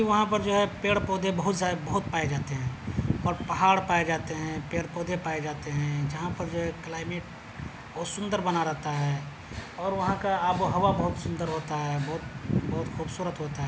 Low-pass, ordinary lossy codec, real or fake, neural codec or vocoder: none; none; real; none